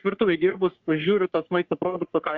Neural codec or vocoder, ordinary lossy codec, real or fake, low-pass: codec, 44.1 kHz, 3.4 kbps, Pupu-Codec; Opus, 64 kbps; fake; 7.2 kHz